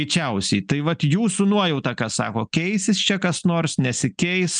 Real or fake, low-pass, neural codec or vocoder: real; 9.9 kHz; none